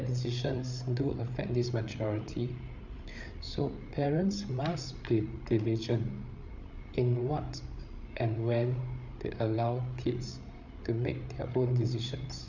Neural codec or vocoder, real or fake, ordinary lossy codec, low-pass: codec, 16 kHz, 8 kbps, FreqCodec, larger model; fake; none; 7.2 kHz